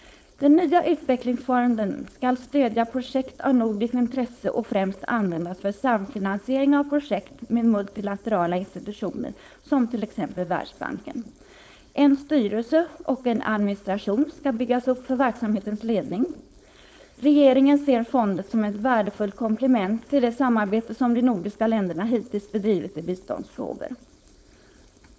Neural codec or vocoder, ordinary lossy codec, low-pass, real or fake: codec, 16 kHz, 4.8 kbps, FACodec; none; none; fake